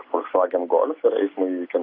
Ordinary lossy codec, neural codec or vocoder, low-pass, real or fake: AAC, 24 kbps; none; 5.4 kHz; real